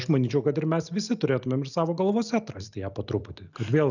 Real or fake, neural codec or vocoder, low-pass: real; none; 7.2 kHz